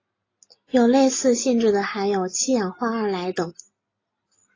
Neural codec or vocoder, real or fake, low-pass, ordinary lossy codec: none; real; 7.2 kHz; AAC, 32 kbps